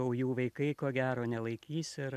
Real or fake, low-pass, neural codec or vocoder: real; 14.4 kHz; none